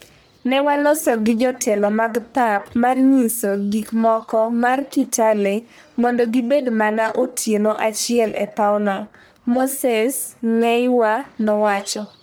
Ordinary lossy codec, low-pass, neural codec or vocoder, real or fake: none; none; codec, 44.1 kHz, 1.7 kbps, Pupu-Codec; fake